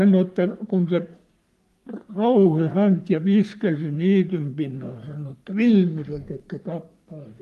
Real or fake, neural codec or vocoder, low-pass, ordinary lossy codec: fake; codec, 44.1 kHz, 3.4 kbps, Pupu-Codec; 14.4 kHz; Opus, 32 kbps